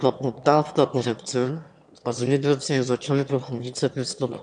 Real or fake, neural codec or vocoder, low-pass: fake; autoencoder, 22.05 kHz, a latent of 192 numbers a frame, VITS, trained on one speaker; 9.9 kHz